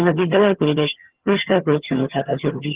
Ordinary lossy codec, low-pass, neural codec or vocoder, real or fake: Opus, 16 kbps; 3.6 kHz; vocoder, 22.05 kHz, 80 mel bands, HiFi-GAN; fake